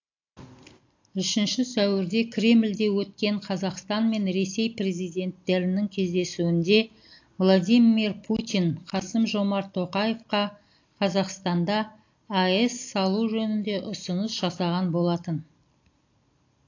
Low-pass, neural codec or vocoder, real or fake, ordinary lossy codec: 7.2 kHz; none; real; AAC, 48 kbps